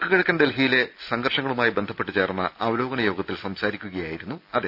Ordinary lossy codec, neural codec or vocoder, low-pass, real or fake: none; none; 5.4 kHz; real